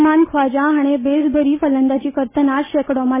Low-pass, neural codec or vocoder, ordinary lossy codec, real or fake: 3.6 kHz; none; MP3, 16 kbps; real